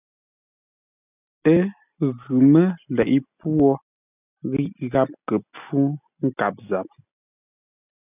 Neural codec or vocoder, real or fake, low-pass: none; real; 3.6 kHz